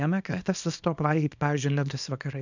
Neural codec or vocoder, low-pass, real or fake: codec, 24 kHz, 0.9 kbps, WavTokenizer, small release; 7.2 kHz; fake